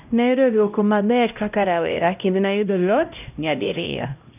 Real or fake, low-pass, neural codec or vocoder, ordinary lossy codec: fake; 3.6 kHz; codec, 16 kHz, 0.5 kbps, X-Codec, HuBERT features, trained on LibriSpeech; none